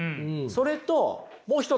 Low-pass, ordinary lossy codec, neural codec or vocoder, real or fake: none; none; none; real